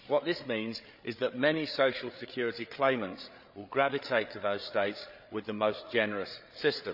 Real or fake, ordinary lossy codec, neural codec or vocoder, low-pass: fake; none; codec, 16 kHz, 8 kbps, FreqCodec, larger model; 5.4 kHz